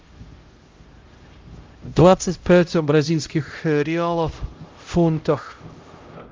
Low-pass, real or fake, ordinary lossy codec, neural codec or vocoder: 7.2 kHz; fake; Opus, 32 kbps; codec, 16 kHz, 0.5 kbps, X-Codec, HuBERT features, trained on LibriSpeech